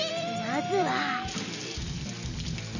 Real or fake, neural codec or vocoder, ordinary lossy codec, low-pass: real; none; none; 7.2 kHz